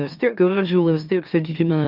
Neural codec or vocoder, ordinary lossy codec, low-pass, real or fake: autoencoder, 44.1 kHz, a latent of 192 numbers a frame, MeloTTS; Opus, 24 kbps; 5.4 kHz; fake